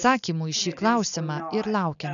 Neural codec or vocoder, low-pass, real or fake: none; 7.2 kHz; real